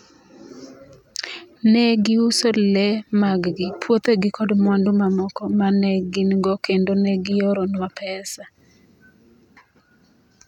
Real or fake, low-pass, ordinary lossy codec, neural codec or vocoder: real; 19.8 kHz; none; none